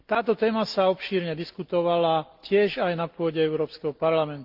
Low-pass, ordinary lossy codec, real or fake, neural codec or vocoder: 5.4 kHz; Opus, 24 kbps; real; none